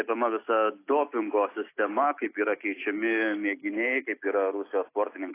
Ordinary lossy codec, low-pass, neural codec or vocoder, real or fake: AAC, 24 kbps; 3.6 kHz; none; real